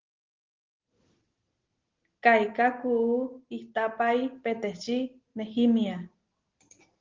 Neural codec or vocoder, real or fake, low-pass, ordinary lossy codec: none; real; 7.2 kHz; Opus, 16 kbps